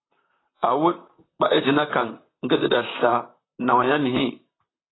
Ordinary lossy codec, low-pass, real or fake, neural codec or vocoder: AAC, 16 kbps; 7.2 kHz; fake; vocoder, 44.1 kHz, 128 mel bands, Pupu-Vocoder